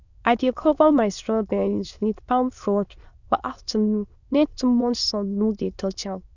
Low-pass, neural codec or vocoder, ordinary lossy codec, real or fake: 7.2 kHz; autoencoder, 22.05 kHz, a latent of 192 numbers a frame, VITS, trained on many speakers; none; fake